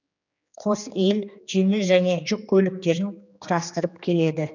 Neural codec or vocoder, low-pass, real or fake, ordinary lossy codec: codec, 16 kHz, 2 kbps, X-Codec, HuBERT features, trained on general audio; 7.2 kHz; fake; none